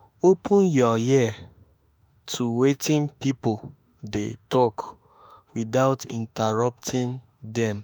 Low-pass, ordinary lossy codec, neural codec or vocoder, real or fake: none; none; autoencoder, 48 kHz, 32 numbers a frame, DAC-VAE, trained on Japanese speech; fake